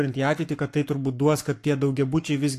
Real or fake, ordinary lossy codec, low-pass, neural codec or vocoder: fake; AAC, 64 kbps; 14.4 kHz; codec, 44.1 kHz, 7.8 kbps, Pupu-Codec